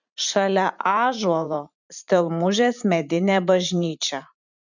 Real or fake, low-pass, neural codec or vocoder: fake; 7.2 kHz; vocoder, 44.1 kHz, 128 mel bands every 512 samples, BigVGAN v2